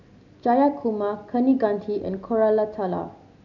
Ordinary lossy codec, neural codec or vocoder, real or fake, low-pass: none; none; real; 7.2 kHz